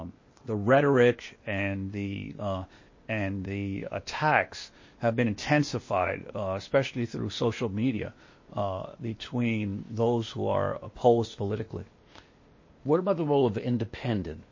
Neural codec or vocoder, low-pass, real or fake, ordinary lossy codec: codec, 16 kHz, 0.8 kbps, ZipCodec; 7.2 kHz; fake; MP3, 32 kbps